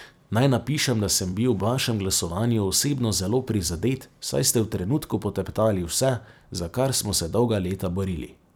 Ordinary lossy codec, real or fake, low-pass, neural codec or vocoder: none; fake; none; vocoder, 44.1 kHz, 128 mel bands every 256 samples, BigVGAN v2